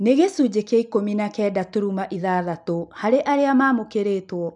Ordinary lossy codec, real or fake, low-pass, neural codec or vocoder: none; real; 10.8 kHz; none